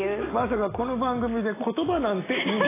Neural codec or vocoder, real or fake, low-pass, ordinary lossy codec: none; real; 3.6 kHz; AAC, 16 kbps